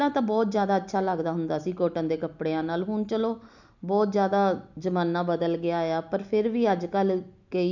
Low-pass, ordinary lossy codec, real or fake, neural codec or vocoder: 7.2 kHz; none; real; none